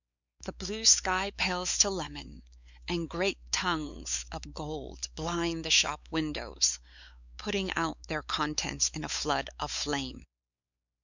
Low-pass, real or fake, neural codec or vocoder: 7.2 kHz; fake; codec, 16 kHz, 4 kbps, X-Codec, WavLM features, trained on Multilingual LibriSpeech